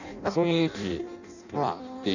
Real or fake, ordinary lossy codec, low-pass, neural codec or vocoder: fake; none; 7.2 kHz; codec, 16 kHz in and 24 kHz out, 0.6 kbps, FireRedTTS-2 codec